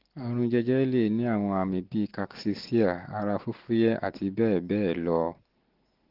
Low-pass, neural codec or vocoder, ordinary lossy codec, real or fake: 5.4 kHz; none; Opus, 16 kbps; real